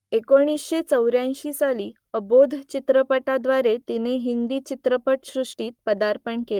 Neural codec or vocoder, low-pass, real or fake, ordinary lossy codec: codec, 44.1 kHz, 7.8 kbps, Pupu-Codec; 19.8 kHz; fake; Opus, 32 kbps